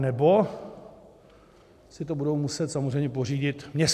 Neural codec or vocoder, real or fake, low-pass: none; real; 14.4 kHz